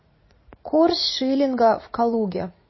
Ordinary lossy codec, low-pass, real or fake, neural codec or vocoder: MP3, 24 kbps; 7.2 kHz; fake; vocoder, 44.1 kHz, 80 mel bands, Vocos